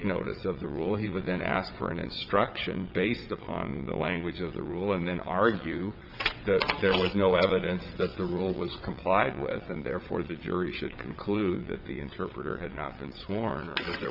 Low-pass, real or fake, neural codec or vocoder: 5.4 kHz; fake; vocoder, 22.05 kHz, 80 mel bands, WaveNeXt